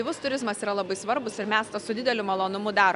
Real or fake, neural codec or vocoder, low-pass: real; none; 10.8 kHz